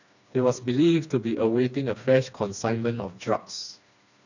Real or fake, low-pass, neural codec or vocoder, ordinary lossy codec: fake; 7.2 kHz; codec, 16 kHz, 2 kbps, FreqCodec, smaller model; none